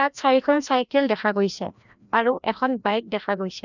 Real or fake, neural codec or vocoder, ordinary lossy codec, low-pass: fake; codec, 16 kHz, 1 kbps, FreqCodec, larger model; none; 7.2 kHz